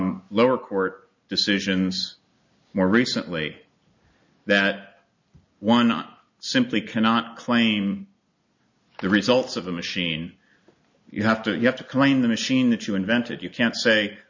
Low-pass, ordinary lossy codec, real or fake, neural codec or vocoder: 7.2 kHz; MP3, 64 kbps; real; none